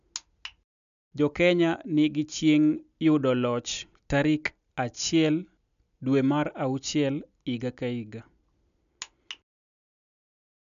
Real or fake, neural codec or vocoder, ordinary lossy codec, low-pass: real; none; none; 7.2 kHz